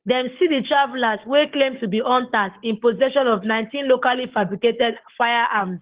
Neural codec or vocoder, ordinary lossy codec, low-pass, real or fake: codec, 44.1 kHz, 7.8 kbps, Pupu-Codec; Opus, 16 kbps; 3.6 kHz; fake